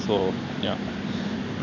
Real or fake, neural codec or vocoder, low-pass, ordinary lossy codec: real; none; 7.2 kHz; none